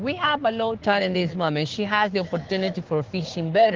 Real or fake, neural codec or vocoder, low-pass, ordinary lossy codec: fake; codec, 16 kHz, 6 kbps, DAC; 7.2 kHz; Opus, 16 kbps